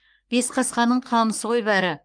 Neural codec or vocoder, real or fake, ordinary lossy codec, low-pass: codec, 44.1 kHz, 3.4 kbps, Pupu-Codec; fake; Opus, 32 kbps; 9.9 kHz